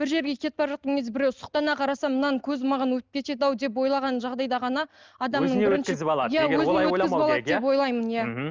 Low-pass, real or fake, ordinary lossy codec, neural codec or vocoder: 7.2 kHz; real; Opus, 24 kbps; none